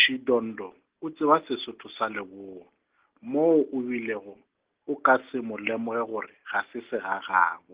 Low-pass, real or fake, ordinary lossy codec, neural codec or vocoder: 3.6 kHz; real; Opus, 16 kbps; none